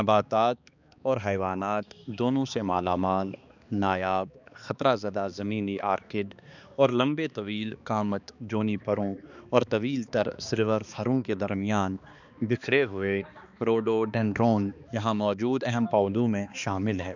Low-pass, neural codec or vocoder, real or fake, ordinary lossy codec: 7.2 kHz; codec, 16 kHz, 2 kbps, X-Codec, HuBERT features, trained on balanced general audio; fake; none